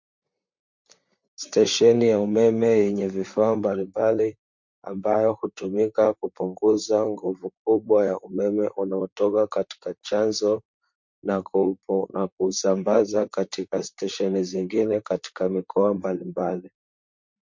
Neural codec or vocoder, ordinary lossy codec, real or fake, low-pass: vocoder, 44.1 kHz, 128 mel bands, Pupu-Vocoder; MP3, 48 kbps; fake; 7.2 kHz